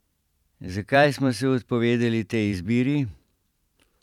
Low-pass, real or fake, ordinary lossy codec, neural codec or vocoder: 19.8 kHz; fake; none; vocoder, 44.1 kHz, 128 mel bands every 512 samples, BigVGAN v2